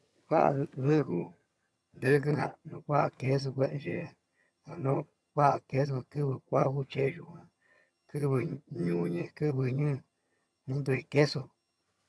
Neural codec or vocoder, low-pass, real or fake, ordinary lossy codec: vocoder, 22.05 kHz, 80 mel bands, HiFi-GAN; none; fake; none